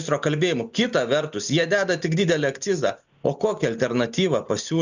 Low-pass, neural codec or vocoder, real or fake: 7.2 kHz; none; real